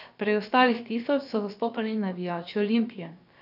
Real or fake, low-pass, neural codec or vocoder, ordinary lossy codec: fake; 5.4 kHz; codec, 16 kHz, about 1 kbps, DyCAST, with the encoder's durations; none